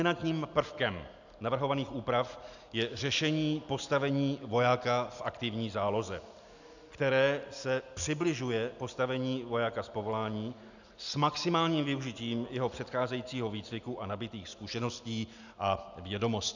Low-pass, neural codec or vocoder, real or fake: 7.2 kHz; none; real